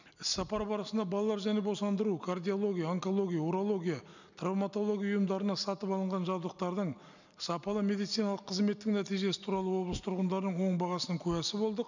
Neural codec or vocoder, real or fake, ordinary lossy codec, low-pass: none; real; none; 7.2 kHz